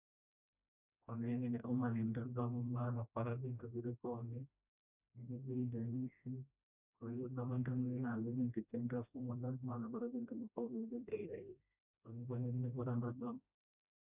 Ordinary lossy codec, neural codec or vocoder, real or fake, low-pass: none; codec, 16 kHz, 1 kbps, FreqCodec, smaller model; fake; 3.6 kHz